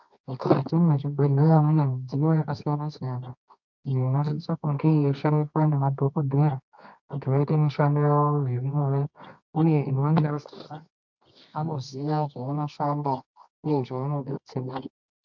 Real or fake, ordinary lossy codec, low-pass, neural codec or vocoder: fake; MP3, 64 kbps; 7.2 kHz; codec, 24 kHz, 0.9 kbps, WavTokenizer, medium music audio release